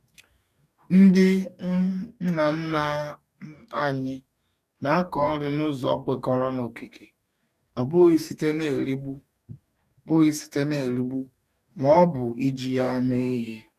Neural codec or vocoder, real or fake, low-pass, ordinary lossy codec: codec, 44.1 kHz, 2.6 kbps, DAC; fake; 14.4 kHz; none